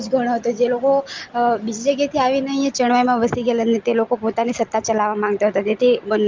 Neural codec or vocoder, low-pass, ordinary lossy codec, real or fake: none; 7.2 kHz; Opus, 32 kbps; real